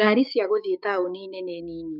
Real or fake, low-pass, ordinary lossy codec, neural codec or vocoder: fake; 5.4 kHz; none; codec, 16 kHz, 6 kbps, DAC